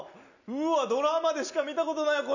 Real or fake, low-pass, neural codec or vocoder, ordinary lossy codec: real; 7.2 kHz; none; none